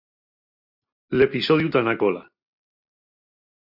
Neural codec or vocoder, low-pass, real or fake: none; 5.4 kHz; real